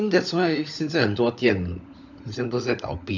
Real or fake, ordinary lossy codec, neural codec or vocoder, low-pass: fake; none; codec, 16 kHz, 16 kbps, FunCodec, trained on LibriTTS, 50 frames a second; 7.2 kHz